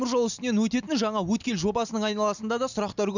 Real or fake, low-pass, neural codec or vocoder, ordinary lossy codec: real; 7.2 kHz; none; none